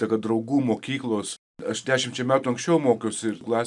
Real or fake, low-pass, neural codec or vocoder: real; 10.8 kHz; none